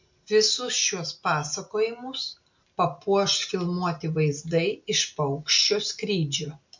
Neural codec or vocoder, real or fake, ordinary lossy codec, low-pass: none; real; MP3, 48 kbps; 7.2 kHz